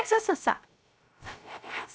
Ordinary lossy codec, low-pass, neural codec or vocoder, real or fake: none; none; codec, 16 kHz, 0.3 kbps, FocalCodec; fake